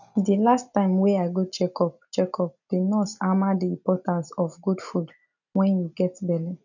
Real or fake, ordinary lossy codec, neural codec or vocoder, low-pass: real; none; none; 7.2 kHz